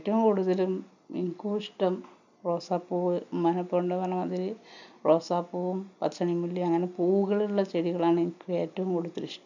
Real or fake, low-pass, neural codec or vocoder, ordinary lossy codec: real; 7.2 kHz; none; none